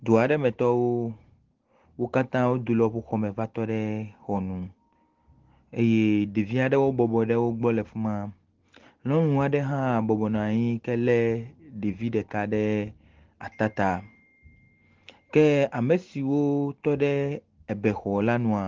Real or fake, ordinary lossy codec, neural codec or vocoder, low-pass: real; Opus, 16 kbps; none; 7.2 kHz